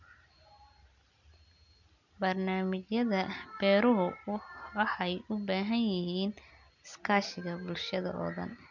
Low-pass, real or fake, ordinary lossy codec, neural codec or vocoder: 7.2 kHz; real; none; none